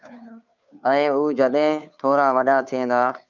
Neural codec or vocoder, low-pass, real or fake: codec, 16 kHz, 2 kbps, FunCodec, trained on Chinese and English, 25 frames a second; 7.2 kHz; fake